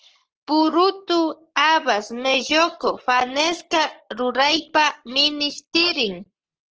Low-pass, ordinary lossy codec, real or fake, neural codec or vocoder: 7.2 kHz; Opus, 16 kbps; fake; codec, 44.1 kHz, 7.8 kbps, Pupu-Codec